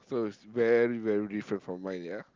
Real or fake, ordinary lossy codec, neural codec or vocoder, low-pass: real; Opus, 24 kbps; none; 7.2 kHz